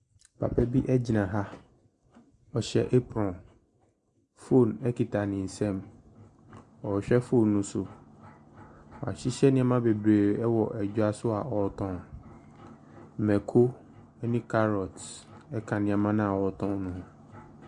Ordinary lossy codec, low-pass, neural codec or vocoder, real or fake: Opus, 64 kbps; 10.8 kHz; none; real